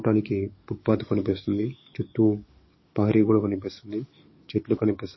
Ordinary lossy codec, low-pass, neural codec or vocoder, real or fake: MP3, 24 kbps; 7.2 kHz; codec, 44.1 kHz, 7.8 kbps, DAC; fake